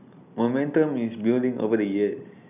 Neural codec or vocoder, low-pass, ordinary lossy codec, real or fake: none; 3.6 kHz; none; real